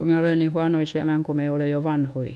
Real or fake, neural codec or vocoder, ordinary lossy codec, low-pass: fake; codec, 24 kHz, 1.2 kbps, DualCodec; none; none